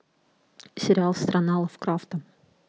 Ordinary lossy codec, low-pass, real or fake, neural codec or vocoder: none; none; real; none